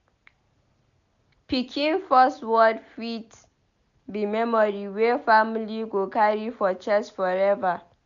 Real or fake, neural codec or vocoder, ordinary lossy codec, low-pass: real; none; none; 7.2 kHz